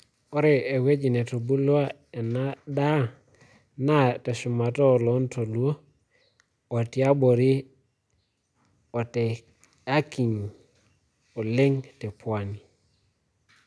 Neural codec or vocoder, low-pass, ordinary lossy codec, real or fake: none; none; none; real